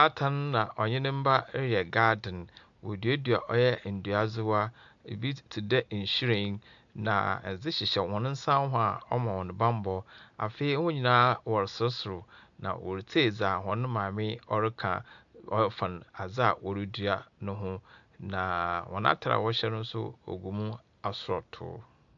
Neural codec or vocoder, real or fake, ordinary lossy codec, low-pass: none; real; AAC, 64 kbps; 7.2 kHz